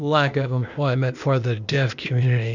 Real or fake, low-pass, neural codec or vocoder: fake; 7.2 kHz; codec, 16 kHz, 0.8 kbps, ZipCodec